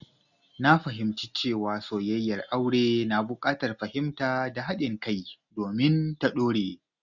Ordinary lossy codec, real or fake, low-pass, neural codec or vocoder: none; real; 7.2 kHz; none